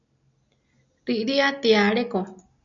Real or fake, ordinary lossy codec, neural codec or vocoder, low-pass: real; MP3, 96 kbps; none; 7.2 kHz